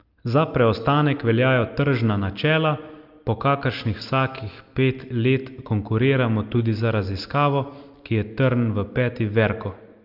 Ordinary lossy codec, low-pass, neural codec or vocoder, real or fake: Opus, 32 kbps; 5.4 kHz; none; real